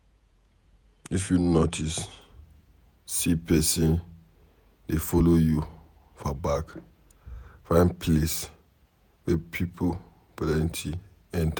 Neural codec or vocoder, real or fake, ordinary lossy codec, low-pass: vocoder, 48 kHz, 128 mel bands, Vocos; fake; none; none